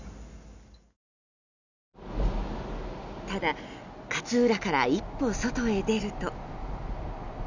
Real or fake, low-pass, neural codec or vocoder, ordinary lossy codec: real; 7.2 kHz; none; none